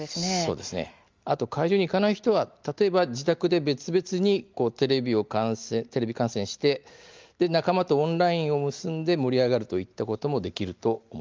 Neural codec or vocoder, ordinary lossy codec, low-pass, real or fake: none; Opus, 32 kbps; 7.2 kHz; real